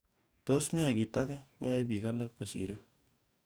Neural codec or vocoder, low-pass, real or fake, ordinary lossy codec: codec, 44.1 kHz, 2.6 kbps, DAC; none; fake; none